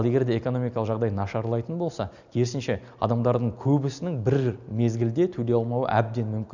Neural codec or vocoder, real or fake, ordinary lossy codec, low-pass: none; real; none; 7.2 kHz